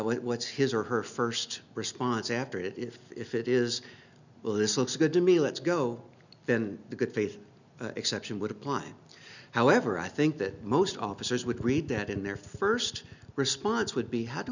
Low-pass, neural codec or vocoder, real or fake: 7.2 kHz; none; real